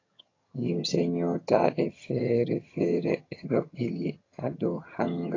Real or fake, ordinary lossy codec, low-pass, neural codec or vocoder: fake; AAC, 32 kbps; 7.2 kHz; vocoder, 22.05 kHz, 80 mel bands, HiFi-GAN